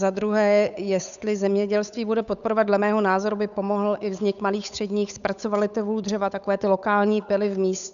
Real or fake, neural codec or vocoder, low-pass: fake; codec, 16 kHz, 8 kbps, FunCodec, trained on Chinese and English, 25 frames a second; 7.2 kHz